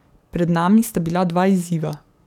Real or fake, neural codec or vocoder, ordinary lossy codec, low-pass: fake; codec, 44.1 kHz, 7.8 kbps, DAC; none; 19.8 kHz